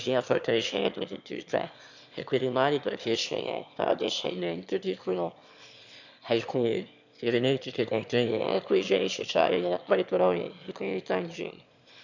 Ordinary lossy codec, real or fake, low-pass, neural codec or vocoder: none; fake; 7.2 kHz; autoencoder, 22.05 kHz, a latent of 192 numbers a frame, VITS, trained on one speaker